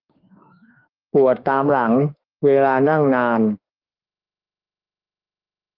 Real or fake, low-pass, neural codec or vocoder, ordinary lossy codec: fake; 5.4 kHz; autoencoder, 48 kHz, 32 numbers a frame, DAC-VAE, trained on Japanese speech; Opus, 32 kbps